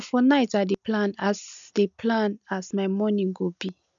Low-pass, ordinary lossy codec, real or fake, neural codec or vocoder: 7.2 kHz; none; real; none